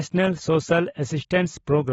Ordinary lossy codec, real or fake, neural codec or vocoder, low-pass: AAC, 24 kbps; real; none; 19.8 kHz